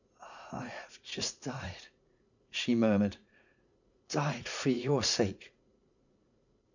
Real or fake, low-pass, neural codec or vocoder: fake; 7.2 kHz; vocoder, 44.1 kHz, 80 mel bands, Vocos